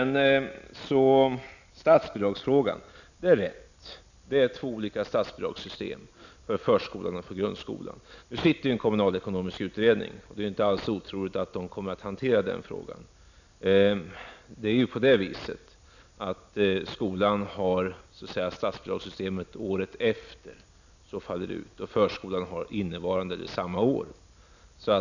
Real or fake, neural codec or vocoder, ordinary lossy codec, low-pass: real; none; none; 7.2 kHz